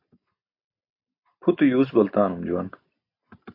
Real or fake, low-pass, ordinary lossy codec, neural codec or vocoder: real; 5.4 kHz; MP3, 32 kbps; none